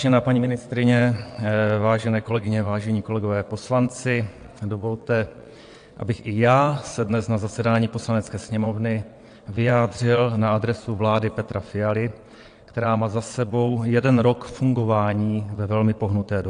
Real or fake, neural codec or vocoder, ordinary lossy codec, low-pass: fake; vocoder, 22.05 kHz, 80 mel bands, WaveNeXt; AAC, 64 kbps; 9.9 kHz